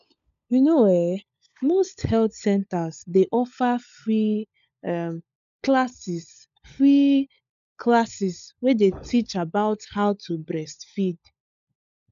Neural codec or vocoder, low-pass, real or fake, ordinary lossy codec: codec, 16 kHz, 16 kbps, FunCodec, trained on LibriTTS, 50 frames a second; 7.2 kHz; fake; none